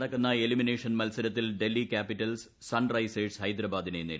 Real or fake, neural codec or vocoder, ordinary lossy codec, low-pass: real; none; none; none